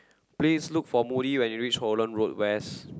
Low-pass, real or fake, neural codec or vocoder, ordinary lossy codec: none; real; none; none